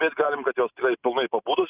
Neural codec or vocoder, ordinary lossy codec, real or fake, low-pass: none; Opus, 64 kbps; real; 3.6 kHz